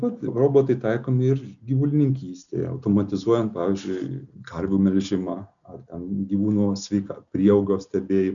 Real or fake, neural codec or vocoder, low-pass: real; none; 7.2 kHz